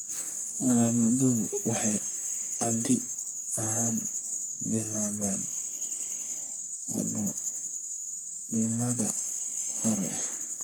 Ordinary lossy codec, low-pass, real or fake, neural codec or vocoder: none; none; fake; codec, 44.1 kHz, 3.4 kbps, Pupu-Codec